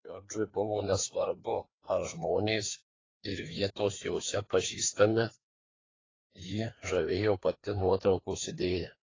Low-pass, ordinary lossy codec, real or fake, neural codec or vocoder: 7.2 kHz; AAC, 32 kbps; fake; codec, 16 kHz, 2 kbps, FreqCodec, larger model